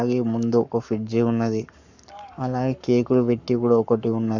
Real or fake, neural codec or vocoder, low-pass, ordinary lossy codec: fake; codec, 44.1 kHz, 7.8 kbps, Pupu-Codec; 7.2 kHz; none